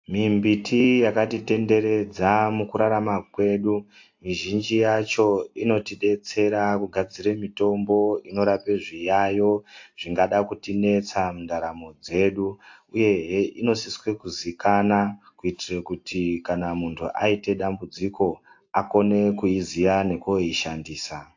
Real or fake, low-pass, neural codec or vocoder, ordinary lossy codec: real; 7.2 kHz; none; AAC, 48 kbps